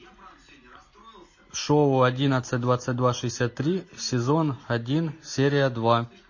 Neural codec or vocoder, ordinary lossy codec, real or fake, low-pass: none; MP3, 32 kbps; real; 7.2 kHz